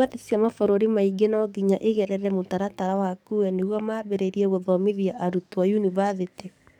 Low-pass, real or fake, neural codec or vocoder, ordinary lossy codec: 19.8 kHz; fake; codec, 44.1 kHz, 7.8 kbps, DAC; none